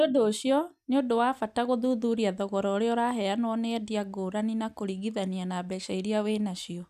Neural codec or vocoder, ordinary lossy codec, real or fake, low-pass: none; none; real; 14.4 kHz